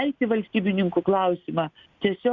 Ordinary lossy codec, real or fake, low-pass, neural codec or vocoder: Opus, 64 kbps; real; 7.2 kHz; none